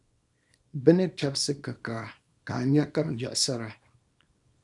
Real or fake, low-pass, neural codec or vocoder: fake; 10.8 kHz; codec, 24 kHz, 0.9 kbps, WavTokenizer, small release